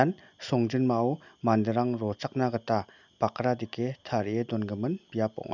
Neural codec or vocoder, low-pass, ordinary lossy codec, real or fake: none; 7.2 kHz; none; real